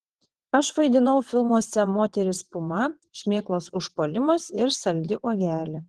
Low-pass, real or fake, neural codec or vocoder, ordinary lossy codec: 9.9 kHz; fake; vocoder, 22.05 kHz, 80 mel bands, WaveNeXt; Opus, 16 kbps